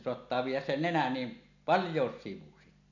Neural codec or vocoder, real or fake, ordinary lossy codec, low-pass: none; real; none; 7.2 kHz